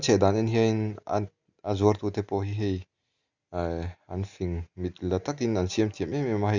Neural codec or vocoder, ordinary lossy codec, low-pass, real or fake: none; Opus, 64 kbps; 7.2 kHz; real